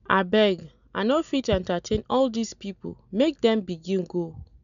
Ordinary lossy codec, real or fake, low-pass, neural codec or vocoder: none; real; 7.2 kHz; none